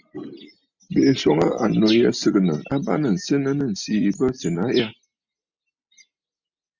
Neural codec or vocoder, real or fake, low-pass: none; real; 7.2 kHz